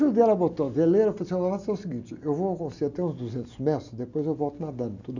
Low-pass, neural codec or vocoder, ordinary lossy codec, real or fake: 7.2 kHz; none; none; real